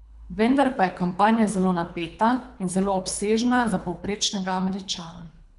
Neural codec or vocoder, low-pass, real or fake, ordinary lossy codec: codec, 24 kHz, 3 kbps, HILCodec; 10.8 kHz; fake; none